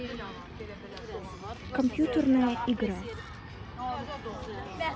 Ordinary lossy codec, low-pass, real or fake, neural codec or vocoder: none; none; real; none